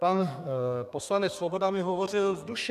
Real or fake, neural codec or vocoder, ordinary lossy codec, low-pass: fake; codec, 32 kHz, 1.9 kbps, SNAC; MP3, 96 kbps; 14.4 kHz